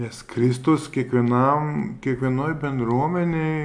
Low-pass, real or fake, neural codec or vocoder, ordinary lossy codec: 9.9 kHz; real; none; AAC, 64 kbps